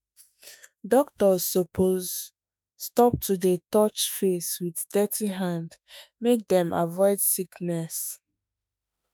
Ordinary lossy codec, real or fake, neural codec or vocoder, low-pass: none; fake; autoencoder, 48 kHz, 32 numbers a frame, DAC-VAE, trained on Japanese speech; none